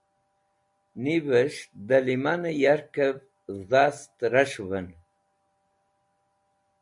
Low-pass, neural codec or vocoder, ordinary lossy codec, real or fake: 10.8 kHz; none; MP3, 64 kbps; real